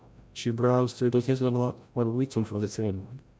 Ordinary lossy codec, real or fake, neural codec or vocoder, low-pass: none; fake; codec, 16 kHz, 0.5 kbps, FreqCodec, larger model; none